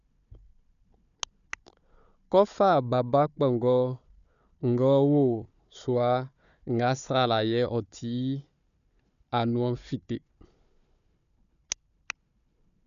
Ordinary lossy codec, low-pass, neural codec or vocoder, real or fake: none; 7.2 kHz; codec, 16 kHz, 4 kbps, FunCodec, trained on Chinese and English, 50 frames a second; fake